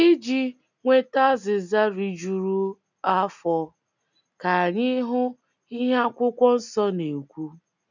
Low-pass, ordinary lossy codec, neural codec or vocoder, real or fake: 7.2 kHz; none; none; real